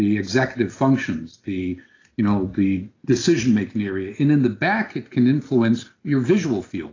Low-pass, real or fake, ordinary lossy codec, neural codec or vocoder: 7.2 kHz; real; AAC, 32 kbps; none